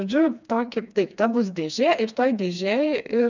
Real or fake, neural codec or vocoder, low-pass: fake; codec, 16 kHz, 2 kbps, FreqCodec, smaller model; 7.2 kHz